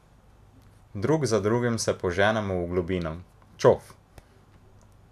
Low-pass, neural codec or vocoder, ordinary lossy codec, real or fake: 14.4 kHz; none; none; real